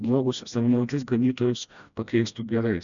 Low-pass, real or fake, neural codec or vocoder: 7.2 kHz; fake; codec, 16 kHz, 1 kbps, FreqCodec, smaller model